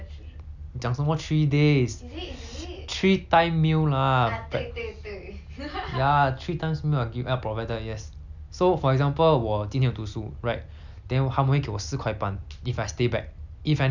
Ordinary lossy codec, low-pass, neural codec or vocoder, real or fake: none; 7.2 kHz; none; real